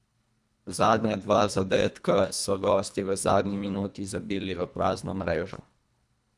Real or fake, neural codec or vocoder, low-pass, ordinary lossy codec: fake; codec, 24 kHz, 1.5 kbps, HILCodec; none; none